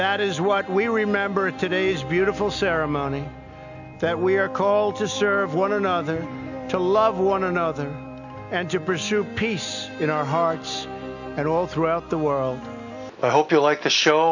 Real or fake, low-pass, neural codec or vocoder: real; 7.2 kHz; none